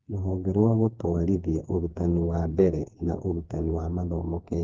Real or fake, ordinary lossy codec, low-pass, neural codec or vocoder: fake; Opus, 32 kbps; 7.2 kHz; codec, 16 kHz, 4 kbps, FreqCodec, smaller model